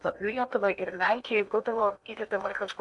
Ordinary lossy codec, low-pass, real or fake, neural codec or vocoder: MP3, 96 kbps; 10.8 kHz; fake; codec, 16 kHz in and 24 kHz out, 0.8 kbps, FocalCodec, streaming, 65536 codes